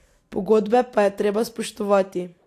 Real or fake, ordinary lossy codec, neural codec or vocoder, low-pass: real; AAC, 64 kbps; none; 14.4 kHz